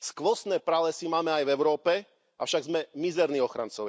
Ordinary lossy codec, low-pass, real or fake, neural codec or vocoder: none; none; real; none